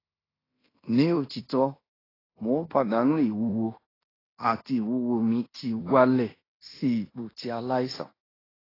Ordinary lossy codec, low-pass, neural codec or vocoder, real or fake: AAC, 24 kbps; 5.4 kHz; codec, 16 kHz in and 24 kHz out, 0.9 kbps, LongCat-Audio-Codec, fine tuned four codebook decoder; fake